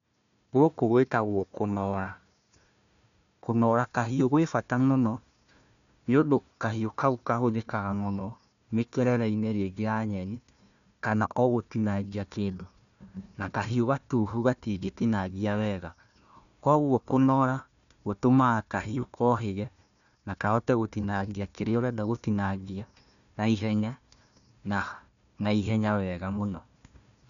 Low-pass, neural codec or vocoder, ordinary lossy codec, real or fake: 7.2 kHz; codec, 16 kHz, 1 kbps, FunCodec, trained on Chinese and English, 50 frames a second; none; fake